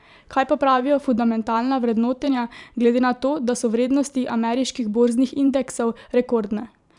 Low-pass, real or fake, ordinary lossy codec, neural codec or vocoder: 10.8 kHz; fake; none; vocoder, 44.1 kHz, 128 mel bands every 512 samples, BigVGAN v2